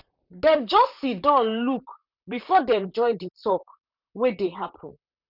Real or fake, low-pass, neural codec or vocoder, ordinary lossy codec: fake; 5.4 kHz; vocoder, 44.1 kHz, 128 mel bands, Pupu-Vocoder; none